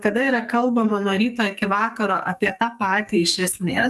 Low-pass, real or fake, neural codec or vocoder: 14.4 kHz; fake; codec, 44.1 kHz, 2.6 kbps, SNAC